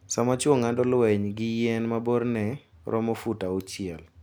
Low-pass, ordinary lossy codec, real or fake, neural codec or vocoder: none; none; real; none